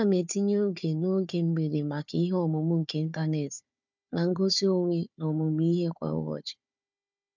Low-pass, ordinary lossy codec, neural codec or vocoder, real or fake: 7.2 kHz; none; codec, 16 kHz, 4 kbps, FunCodec, trained on Chinese and English, 50 frames a second; fake